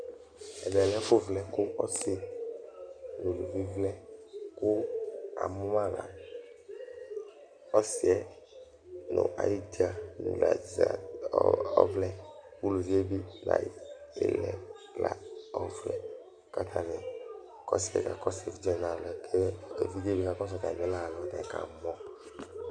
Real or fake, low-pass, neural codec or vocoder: real; 9.9 kHz; none